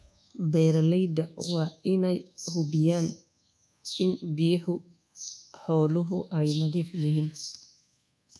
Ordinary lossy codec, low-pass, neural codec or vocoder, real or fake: none; none; codec, 24 kHz, 1.2 kbps, DualCodec; fake